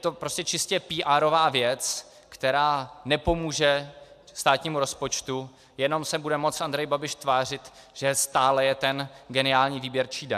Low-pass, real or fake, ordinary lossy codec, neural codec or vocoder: 14.4 kHz; real; AAC, 96 kbps; none